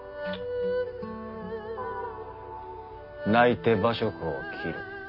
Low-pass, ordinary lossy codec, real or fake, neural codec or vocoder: 5.4 kHz; none; real; none